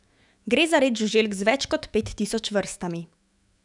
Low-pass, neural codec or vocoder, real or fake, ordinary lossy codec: 10.8 kHz; autoencoder, 48 kHz, 128 numbers a frame, DAC-VAE, trained on Japanese speech; fake; none